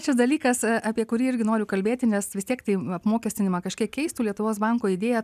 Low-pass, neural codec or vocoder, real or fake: 14.4 kHz; none; real